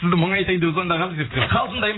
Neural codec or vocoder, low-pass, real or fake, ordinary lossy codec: vocoder, 44.1 kHz, 80 mel bands, Vocos; 7.2 kHz; fake; AAC, 16 kbps